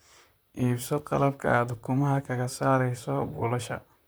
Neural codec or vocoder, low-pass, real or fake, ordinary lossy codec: vocoder, 44.1 kHz, 128 mel bands, Pupu-Vocoder; none; fake; none